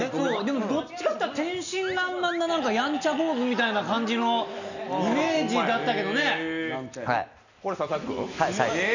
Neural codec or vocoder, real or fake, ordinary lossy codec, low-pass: none; real; none; 7.2 kHz